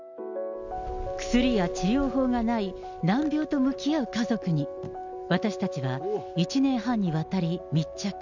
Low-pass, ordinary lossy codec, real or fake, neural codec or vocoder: 7.2 kHz; none; real; none